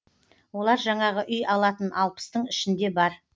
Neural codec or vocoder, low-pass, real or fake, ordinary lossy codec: none; none; real; none